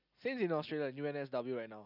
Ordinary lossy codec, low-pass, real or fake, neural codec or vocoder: none; 5.4 kHz; real; none